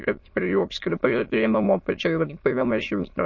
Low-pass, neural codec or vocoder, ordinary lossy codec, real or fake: 7.2 kHz; autoencoder, 22.05 kHz, a latent of 192 numbers a frame, VITS, trained on many speakers; MP3, 32 kbps; fake